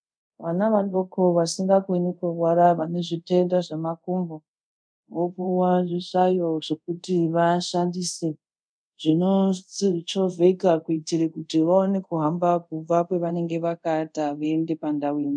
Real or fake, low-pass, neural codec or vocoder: fake; 9.9 kHz; codec, 24 kHz, 0.5 kbps, DualCodec